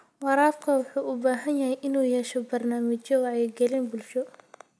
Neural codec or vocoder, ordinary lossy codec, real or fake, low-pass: none; none; real; none